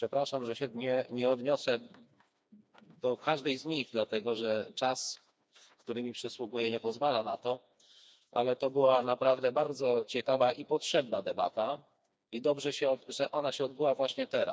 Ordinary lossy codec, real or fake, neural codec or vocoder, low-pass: none; fake; codec, 16 kHz, 2 kbps, FreqCodec, smaller model; none